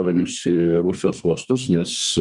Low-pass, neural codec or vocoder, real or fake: 10.8 kHz; codec, 24 kHz, 1 kbps, SNAC; fake